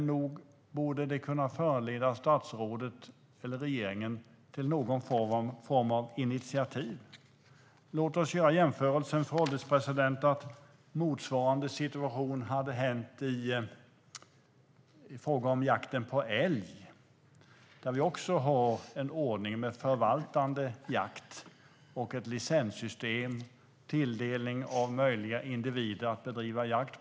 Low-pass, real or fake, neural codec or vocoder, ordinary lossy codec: none; real; none; none